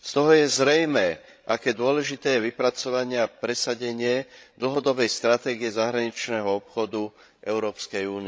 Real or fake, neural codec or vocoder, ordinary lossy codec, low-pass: fake; codec, 16 kHz, 16 kbps, FreqCodec, larger model; none; none